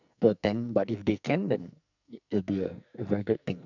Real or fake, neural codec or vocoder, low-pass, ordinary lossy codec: fake; codec, 44.1 kHz, 2.6 kbps, SNAC; 7.2 kHz; none